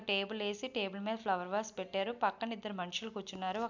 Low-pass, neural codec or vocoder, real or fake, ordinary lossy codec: 7.2 kHz; none; real; MP3, 64 kbps